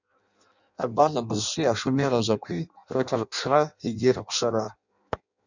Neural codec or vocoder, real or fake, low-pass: codec, 16 kHz in and 24 kHz out, 0.6 kbps, FireRedTTS-2 codec; fake; 7.2 kHz